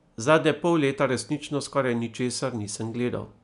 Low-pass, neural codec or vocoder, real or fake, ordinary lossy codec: 10.8 kHz; none; real; none